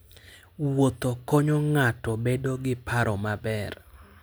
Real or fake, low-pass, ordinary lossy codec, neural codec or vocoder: real; none; none; none